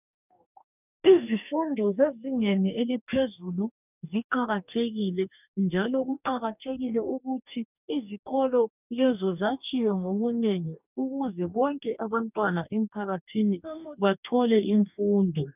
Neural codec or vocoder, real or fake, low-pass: codec, 44.1 kHz, 2.6 kbps, DAC; fake; 3.6 kHz